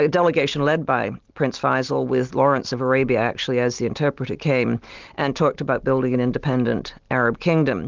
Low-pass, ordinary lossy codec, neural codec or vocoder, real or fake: 7.2 kHz; Opus, 32 kbps; none; real